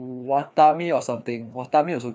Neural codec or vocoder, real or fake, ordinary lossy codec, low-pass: codec, 16 kHz, 2 kbps, FreqCodec, larger model; fake; none; none